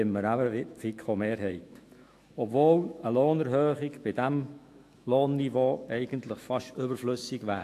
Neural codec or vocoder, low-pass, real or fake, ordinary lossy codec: autoencoder, 48 kHz, 128 numbers a frame, DAC-VAE, trained on Japanese speech; 14.4 kHz; fake; AAC, 64 kbps